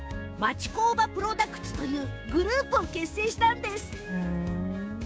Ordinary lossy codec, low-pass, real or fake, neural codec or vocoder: none; none; fake; codec, 16 kHz, 6 kbps, DAC